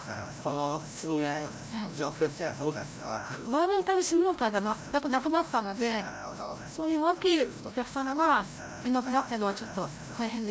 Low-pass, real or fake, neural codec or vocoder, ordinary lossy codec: none; fake; codec, 16 kHz, 0.5 kbps, FreqCodec, larger model; none